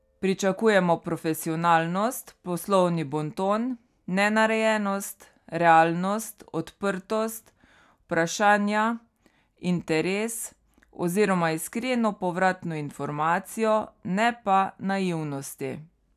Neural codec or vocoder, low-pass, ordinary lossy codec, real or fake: none; 14.4 kHz; none; real